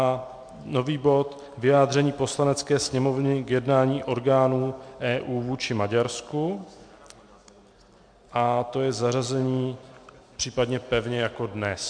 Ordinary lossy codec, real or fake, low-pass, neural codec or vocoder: MP3, 64 kbps; real; 9.9 kHz; none